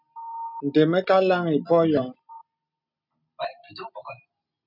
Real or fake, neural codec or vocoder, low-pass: real; none; 5.4 kHz